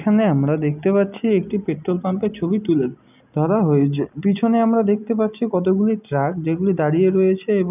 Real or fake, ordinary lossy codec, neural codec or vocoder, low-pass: real; none; none; 3.6 kHz